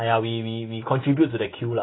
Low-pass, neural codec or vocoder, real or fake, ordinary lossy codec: 7.2 kHz; none; real; AAC, 16 kbps